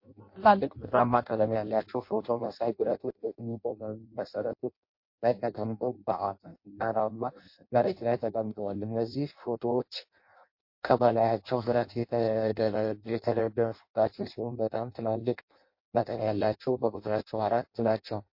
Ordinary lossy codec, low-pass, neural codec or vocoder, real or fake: MP3, 32 kbps; 5.4 kHz; codec, 16 kHz in and 24 kHz out, 0.6 kbps, FireRedTTS-2 codec; fake